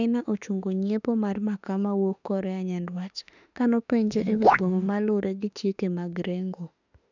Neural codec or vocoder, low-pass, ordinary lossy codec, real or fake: autoencoder, 48 kHz, 32 numbers a frame, DAC-VAE, trained on Japanese speech; 7.2 kHz; none; fake